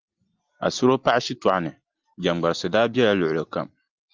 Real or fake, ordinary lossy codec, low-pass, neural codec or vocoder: real; Opus, 24 kbps; 7.2 kHz; none